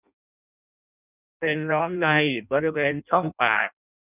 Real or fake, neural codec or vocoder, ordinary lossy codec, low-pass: fake; codec, 16 kHz in and 24 kHz out, 0.6 kbps, FireRedTTS-2 codec; none; 3.6 kHz